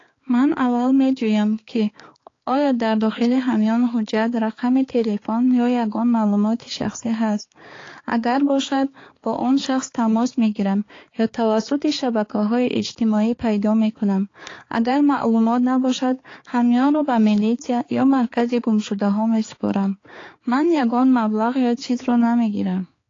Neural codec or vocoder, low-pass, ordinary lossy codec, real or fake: codec, 16 kHz, 4 kbps, X-Codec, HuBERT features, trained on balanced general audio; 7.2 kHz; AAC, 32 kbps; fake